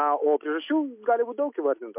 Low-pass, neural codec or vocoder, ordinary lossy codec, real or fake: 3.6 kHz; none; MP3, 32 kbps; real